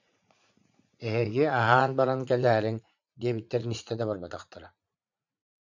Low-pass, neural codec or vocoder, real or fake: 7.2 kHz; vocoder, 44.1 kHz, 80 mel bands, Vocos; fake